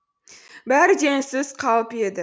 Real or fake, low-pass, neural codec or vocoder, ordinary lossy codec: real; none; none; none